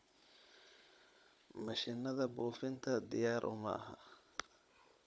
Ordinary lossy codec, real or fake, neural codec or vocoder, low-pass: none; fake; codec, 16 kHz, 16 kbps, FunCodec, trained on Chinese and English, 50 frames a second; none